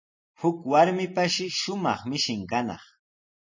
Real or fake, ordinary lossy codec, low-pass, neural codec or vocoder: real; MP3, 32 kbps; 7.2 kHz; none